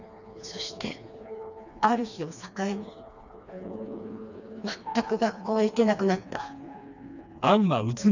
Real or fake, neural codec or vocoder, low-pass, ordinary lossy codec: fake; codec, 16 kHz, 2 kbps, FreqCodec, smaller model; 7.2 kHz; none